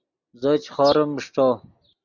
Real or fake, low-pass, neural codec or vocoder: real; 7.2 kHz; none